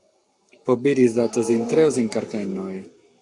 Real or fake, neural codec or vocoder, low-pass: fake; codec, 44.1 kHz, 7.8 kbps, Pupu-Codec; 10.8 kHz